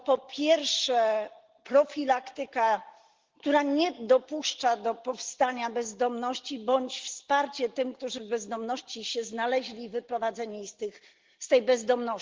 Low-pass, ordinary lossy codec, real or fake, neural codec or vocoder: 7.2 kHz; Opus, 16 kbps; real; none